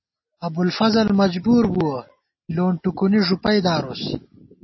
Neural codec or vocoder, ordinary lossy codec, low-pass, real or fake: none; MP3, 24 kbps; 7.2 kHz; real